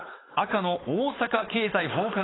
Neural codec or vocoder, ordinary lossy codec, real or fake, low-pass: codec, 16 kHz, 4.8 kbps, FACodec; AAC, 16 kbps; fake; 7.2 kHz